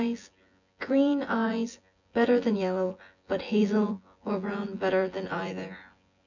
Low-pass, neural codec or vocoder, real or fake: 7.2 kHz; vocoder, 24 kHz, 100 mel bands, Vocos; fake